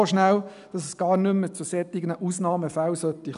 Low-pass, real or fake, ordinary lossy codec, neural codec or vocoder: 10.8 kHz; real; none; none